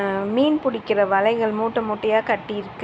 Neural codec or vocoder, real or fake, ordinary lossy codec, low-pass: none; real; none; none